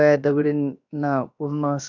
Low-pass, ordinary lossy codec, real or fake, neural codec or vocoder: 7.2 kHz; none; fake; codec, 16 kHz, 0.7 kbps, FocalCodec